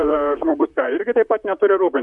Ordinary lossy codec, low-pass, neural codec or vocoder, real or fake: MP3, 96 kbps; 10.8 kHz; vocoder, 44.1 kHz, 128 mel bands, Pupu-Vocoder; fake